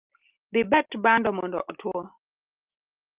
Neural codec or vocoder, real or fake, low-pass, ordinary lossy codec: none; real; 3.6 kHz; Opus, 32 kbps